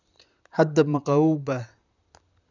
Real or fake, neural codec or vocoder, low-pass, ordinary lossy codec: fake; vocoder, 44.1 kHz, 128 mel bands, Pupu-Vocoder; 7.2 kHz; none